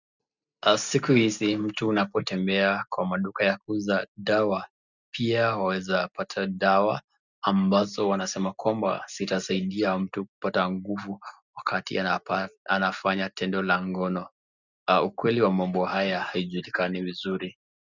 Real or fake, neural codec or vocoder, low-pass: real; none; 7.2 kHz